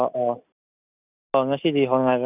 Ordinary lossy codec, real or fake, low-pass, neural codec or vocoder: none; real; 3.6 kHz; none